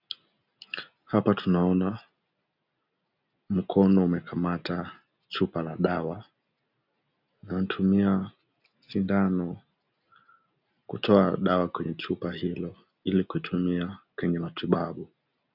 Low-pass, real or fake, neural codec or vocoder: 5.4 kHz; real; none